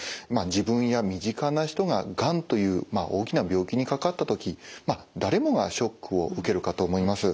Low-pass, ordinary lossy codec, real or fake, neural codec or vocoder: none; none; real; none